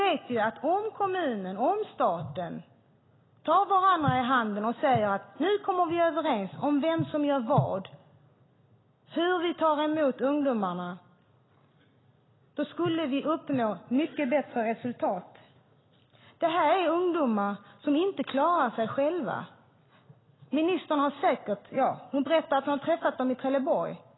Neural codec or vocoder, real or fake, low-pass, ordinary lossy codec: none; real; 7.2 kHz; AAC, 16 kbps